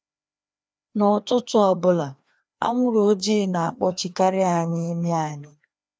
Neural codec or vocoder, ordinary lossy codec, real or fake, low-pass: codec, 16 kHz, 2 kbps, FreqCodec, larger model; none; fake; none